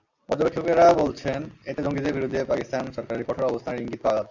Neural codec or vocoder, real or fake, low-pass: none; real; 7.2 kHz